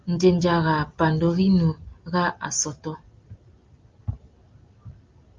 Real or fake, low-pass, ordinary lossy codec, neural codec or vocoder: real; 7.2 kHz; Opus, 32 kbps; none